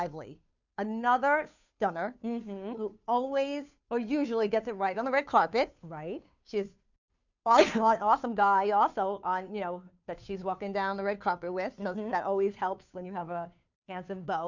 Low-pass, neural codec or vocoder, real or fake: 7.2 kHz; codec, 16 kHz, 2 kbps, FunCodec, trained on Chinese and English, 25 frames a second; fake